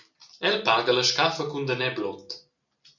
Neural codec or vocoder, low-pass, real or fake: none; 7.2 kHz; real